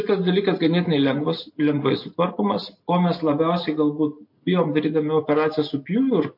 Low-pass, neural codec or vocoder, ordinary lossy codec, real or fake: 5.4 kHz; none; MP3, 32 kbps; real